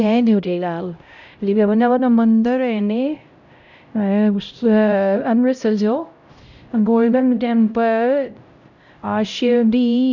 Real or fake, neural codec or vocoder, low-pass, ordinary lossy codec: fake; codec, 16 kHz, 0.5 kbps, X-Codec, HuBERT features, trained on LibriSpeech; 7.2 kHz; none